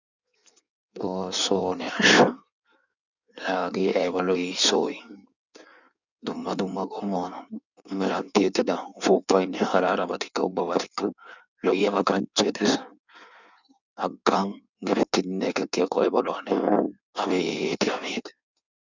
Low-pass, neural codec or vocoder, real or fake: 7.2 kHz; codec, 16 kHz in and 24 kHz out, 1.1 kbps, FireRedTTS-2 codec; fake